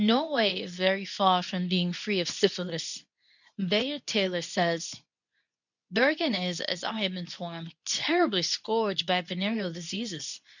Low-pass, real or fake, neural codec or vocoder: 7.2 kHz; fake; codec, 24 kHz, 0.9 kbps, WavTokenizer, medium speech release version 2